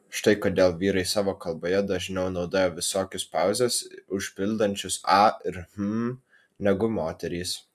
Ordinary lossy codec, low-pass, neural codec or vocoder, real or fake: AAC, 96 kbps; 14.4 kHz; none; real